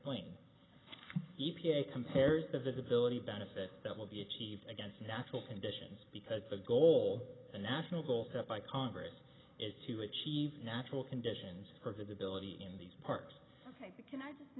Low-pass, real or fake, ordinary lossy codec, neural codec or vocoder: 7.2 kHz; real; AAC, 16 kbps; none